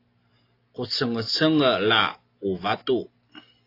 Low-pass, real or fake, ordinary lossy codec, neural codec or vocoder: 5.4 kHz; real; AAC, 32 kbps; none